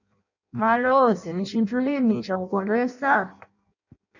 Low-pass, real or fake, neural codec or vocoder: 7.2 kHz; fake; codec, 16 kHz in and 24 kHz out, 0.6 kbps, FireRedTTS-2 codec